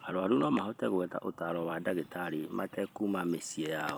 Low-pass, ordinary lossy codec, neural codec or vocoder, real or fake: none; none; vocoder, 44.1 kHz, 128 mel bands every 256 samples, BigVGAN v2; fake